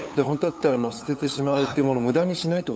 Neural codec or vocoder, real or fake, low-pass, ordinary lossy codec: codec, 16 kHz, 8 kbps, FunCodec, trained on LibriTTS, 25 frames a second; fake; none; none